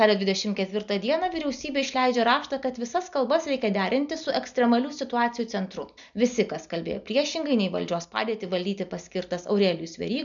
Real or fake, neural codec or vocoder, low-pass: real; none; 7.2 kHz